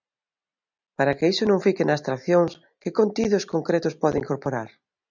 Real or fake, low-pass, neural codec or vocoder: real; 7.2 kHz; none